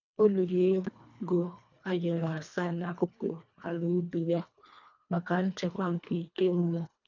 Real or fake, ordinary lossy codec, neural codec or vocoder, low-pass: fake; none; codec, 24 kHz, 1.5 kbps, HILCodec; 7.2 kHz